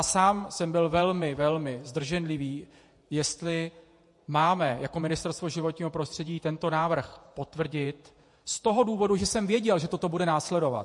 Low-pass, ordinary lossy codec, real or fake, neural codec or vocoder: 10.8 kHz; MP3, 48 kbps; fake; vocoder, 48 kHz, 128 mel bands, Vocos